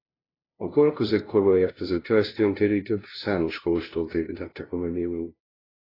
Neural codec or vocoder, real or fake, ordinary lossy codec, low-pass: codec, 16 kHz, 0.5 kbps, FunCodec, trained on LibriTTS, 25 frames a second; fake; AAC, 24 kbps; 5.4 kHz